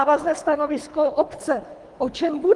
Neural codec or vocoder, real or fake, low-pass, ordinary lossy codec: codec, 24 kHz, 3 kbps, HILCodec; fake; 10.8 kHz; Opus, 24 kbps